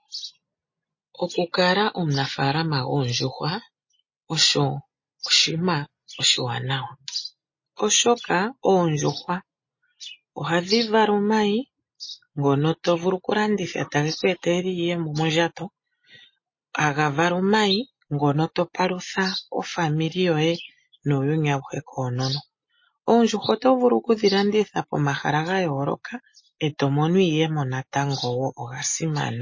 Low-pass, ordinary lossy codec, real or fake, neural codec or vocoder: 7.2 kHz; MP3, 32 kbps; real; none